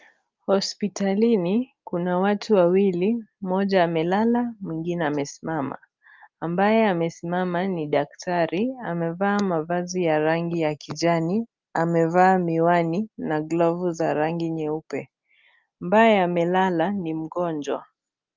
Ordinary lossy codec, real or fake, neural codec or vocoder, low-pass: Opus, 24 kbps; real; none; 7.2 kHz